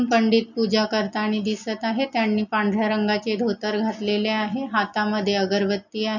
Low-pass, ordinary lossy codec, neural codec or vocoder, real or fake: 7.2 kHz; none; none; real